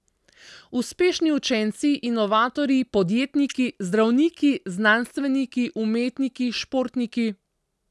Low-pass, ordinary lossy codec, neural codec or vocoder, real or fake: none; none; none; real